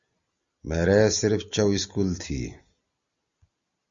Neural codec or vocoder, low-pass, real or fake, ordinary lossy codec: none; 7.2 kHz; real; Opus, 64 kbps